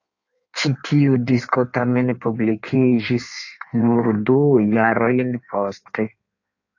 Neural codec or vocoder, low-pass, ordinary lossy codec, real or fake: codec, 16 kHz in and 24 kHz out, 1.1 kbps, FireRedTTS-2 codec; 7.2 kHz; AAC, 48 kbps; fake